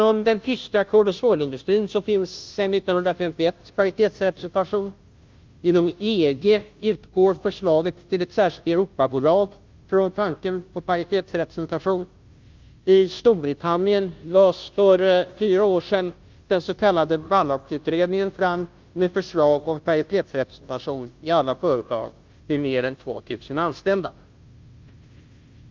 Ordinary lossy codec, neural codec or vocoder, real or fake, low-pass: Opus, 24 kbps; codec, 16 kHz, 0.5 kbps, FunCodec, trained on Chinese and English, 25 frames a second; fake; 7.2 kHz